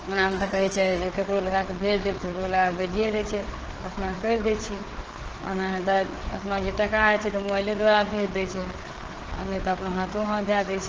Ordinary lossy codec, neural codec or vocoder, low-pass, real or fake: Opus, 16 kbps; codec, 16 kHz, 4 kbps, FreqCodec, larger model; 7.2 kHz; fake